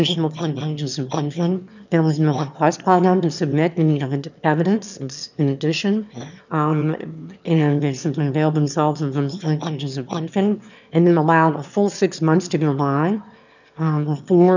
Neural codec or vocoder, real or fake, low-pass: autoencoder, 22.05 kHz, a latent of 192 numbers a frame, VITS, trained on one speaker; fake; 7.2 kHz